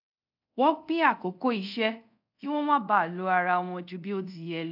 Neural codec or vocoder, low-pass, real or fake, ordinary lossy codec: codec, 24 kHz, 0.5 kbps, DualCodec; 5.4 kHz; fake; AAC, 48 kbps